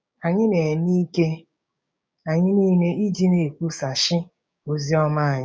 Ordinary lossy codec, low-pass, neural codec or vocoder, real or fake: none; none; codec, 16 kHz, 6 kbps, DAC; fake